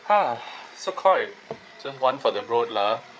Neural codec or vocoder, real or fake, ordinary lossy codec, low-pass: codec, 16 kHz, 8 kbps, FreqCodec, larger model; fake; none; none